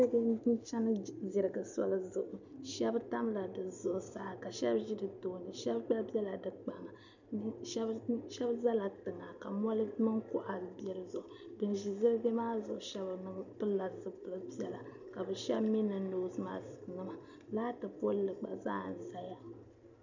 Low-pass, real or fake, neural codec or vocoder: 7.2 kHz; real; none